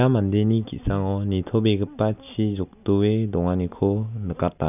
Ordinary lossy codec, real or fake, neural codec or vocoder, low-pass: none; real; none; 3.6 kHz